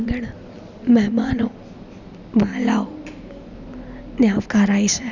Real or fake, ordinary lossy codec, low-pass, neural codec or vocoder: real; none; 7.2 kHz; none